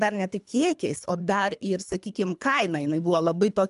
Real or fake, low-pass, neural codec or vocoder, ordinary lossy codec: fake; 10.8 kHz; codec, 24 kHz, 3 kbps, HILCodec; MP3, 96 kbps